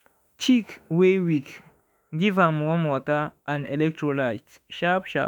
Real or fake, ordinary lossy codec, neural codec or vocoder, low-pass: fake; none; autoencoder, 48 kHz, 32 numbers a frame, DAC-VAE, trained on Japanese speech; 19.8 kHz